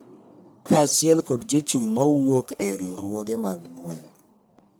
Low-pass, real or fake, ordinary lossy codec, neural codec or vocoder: none; fake; none; codec, 44.1 kHz, 1.7 kbps, Pupu-Codec